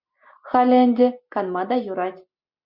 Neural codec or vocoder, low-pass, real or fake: none; 5.4 kHz; real